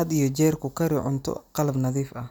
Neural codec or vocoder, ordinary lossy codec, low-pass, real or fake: none; none; none; real